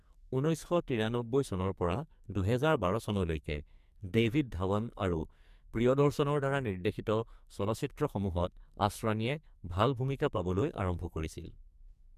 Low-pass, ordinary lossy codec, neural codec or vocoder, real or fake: 14.4 kHz; MP3, 96 kbps; codec, 44.1 kHz, 2.6 kbps, SNAC; fake